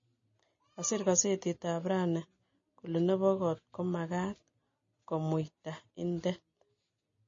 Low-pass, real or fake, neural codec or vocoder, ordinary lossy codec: 7.2 kHz; real; none; MP3, 32 kbps